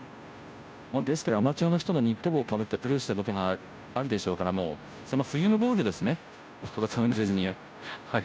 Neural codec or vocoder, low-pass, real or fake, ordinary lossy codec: codec, 16 kHz, 0.5 kbps, FunCodec, trained on Chinese and English, 25 frames a second; none; fake; none